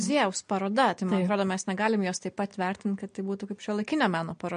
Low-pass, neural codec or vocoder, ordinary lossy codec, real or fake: 9.9 kHz; none; MP3, 48 kbps; real